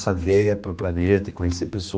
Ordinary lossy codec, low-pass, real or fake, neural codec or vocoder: none; none; fake; codec, 16 kHz, 2 kbps, X-Codec, HuBERT features, trained on general audio